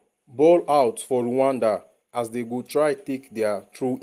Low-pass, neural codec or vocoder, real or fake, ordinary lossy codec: 14.4 kHz; none; real; Opus, 24 kbps